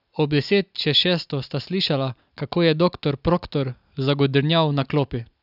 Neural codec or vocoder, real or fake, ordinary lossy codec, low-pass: none; real; none; 5.4 kHz